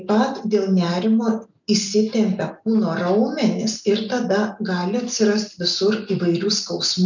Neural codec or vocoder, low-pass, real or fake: none; 7.2 kHz; real